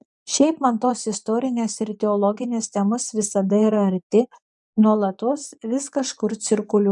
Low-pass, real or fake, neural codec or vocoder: 10.8 kHz; fake; vocoder, 24 kHz, 100 mel bands, Vocos